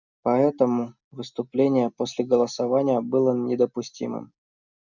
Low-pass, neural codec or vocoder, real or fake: 7.2 kHz; none; real